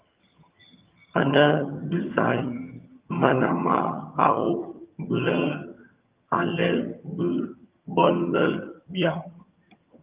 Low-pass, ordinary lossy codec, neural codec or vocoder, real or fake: 3.6 kHz; Opus, 24 kbps; vocoder, 22.05 kHz, 80 mel bands, HiFi-GAN; fake